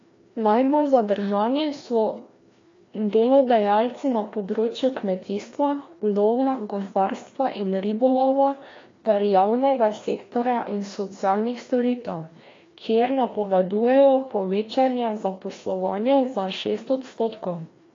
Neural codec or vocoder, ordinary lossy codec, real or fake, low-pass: codec, 16 kHz, 1 kbps, FreqCodec, larger model; AAC, 32 kbps; fake; 7.2 kHz